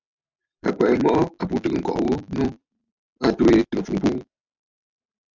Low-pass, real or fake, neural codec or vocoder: 7.2 kHz; real; none